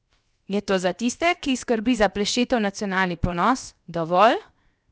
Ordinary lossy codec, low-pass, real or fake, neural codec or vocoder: none; none; fake; codec, 16 kHz, 0.7 kbps, FocalCodec